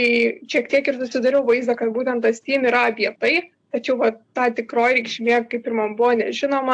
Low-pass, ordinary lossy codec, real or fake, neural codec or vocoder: 9.9 kHz; AAC, 64 kbps; real; none